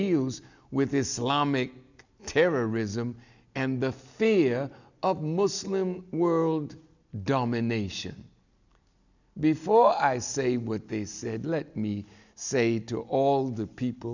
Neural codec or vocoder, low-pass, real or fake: none; 7.2 kHz; real